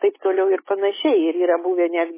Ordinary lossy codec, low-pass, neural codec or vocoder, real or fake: MP3, 16 kbps; 3.6 kHz; none; real